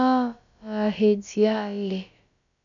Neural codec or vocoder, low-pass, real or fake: codec, 16 kHz, about 1 kbps, DyCAST, with the encoder's durations; 7.2 kHz; fake